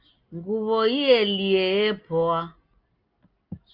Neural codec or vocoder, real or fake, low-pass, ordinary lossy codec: none; real; 5.4 kHz; Opus, 24 kbps